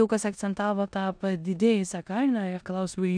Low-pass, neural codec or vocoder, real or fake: 9.9 kHz; codec, 16 kHz in and 24 kHz out, 0.9 kbps, LongCat-Audio-Codec, four codebook decoder; fake